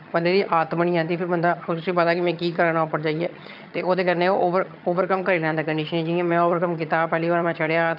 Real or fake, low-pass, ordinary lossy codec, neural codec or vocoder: fake; 5.4 kHz; none; vocoder, 22.05 kHz, 80 mel bands, HiFi-GAN